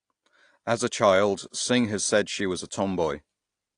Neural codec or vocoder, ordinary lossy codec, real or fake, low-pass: none; AAC, 48 kbps; real; 9.9 kHz